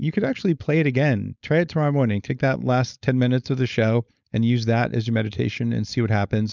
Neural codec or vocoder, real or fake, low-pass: codec, 16 kHz, 4.8 kbps, FACodec; fake; 7.2 kHz